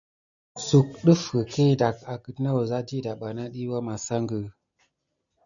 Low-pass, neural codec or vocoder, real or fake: 7.2 kHz; none; real